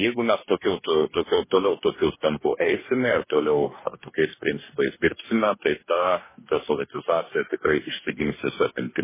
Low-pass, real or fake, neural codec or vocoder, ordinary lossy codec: 3.6 kHz; fake; codec, 44.1 kHz, 2.6 kbps, DAC; MP3, 16 kbps